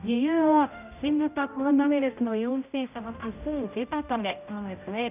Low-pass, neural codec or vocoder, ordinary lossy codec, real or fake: 3.6 kHz; codec, 16 kHz, 0.5 kbps, X-Codec, HuBERT features, trained on general audio; none; fake